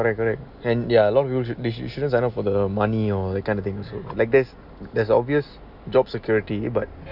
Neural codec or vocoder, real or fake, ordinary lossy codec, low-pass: none; real; none; 5.4 kHz